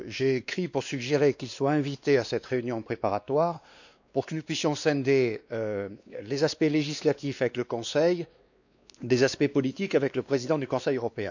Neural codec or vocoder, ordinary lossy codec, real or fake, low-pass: codec, 16 kHz, 2 kbps, X-Codec, WavLM features, trained on Multilingual LibriSpeech; none; fake; 7.2 kHz